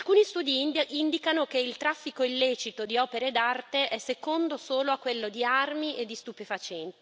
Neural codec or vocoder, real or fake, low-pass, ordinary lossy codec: none; real; none; none